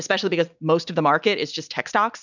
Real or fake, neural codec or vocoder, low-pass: real; none; 7.2 kHz